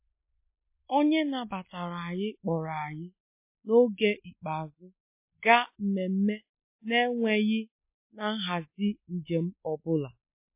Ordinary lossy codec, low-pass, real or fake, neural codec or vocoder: MP3, 24 kbps; 3.6 kHz; real; none